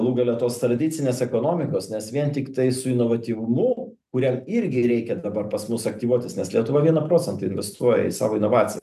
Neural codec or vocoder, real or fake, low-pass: none; real; 14.4 kHz